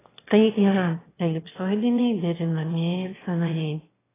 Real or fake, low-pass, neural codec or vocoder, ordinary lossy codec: fake; 3.6 kHz; autoencoder, 22.05 kHz, a latent of 192 numbers a frame, VITS, trained on one speaker; AAC, 16 kbps